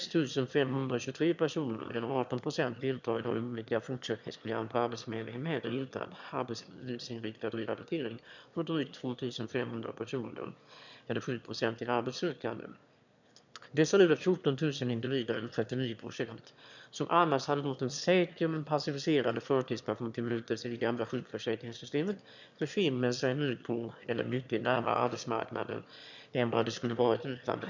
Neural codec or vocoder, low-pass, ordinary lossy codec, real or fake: autoencoder, 22.05 kHz, a latent of 192 numbers a frame, VITS, trained on one speaker; 7.2 kHz; none; fake